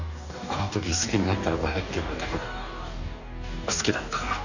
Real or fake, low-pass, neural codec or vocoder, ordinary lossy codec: fake; 7.2 kHz; codec, 44.1 kHz, 2.6 kbps, DAC; none